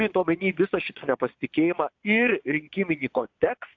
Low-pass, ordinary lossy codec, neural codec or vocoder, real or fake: 7.2 kHz; AAC, 48 kbps; vocoder, 22.05 kHz, 80 mel bands, Vocos; fake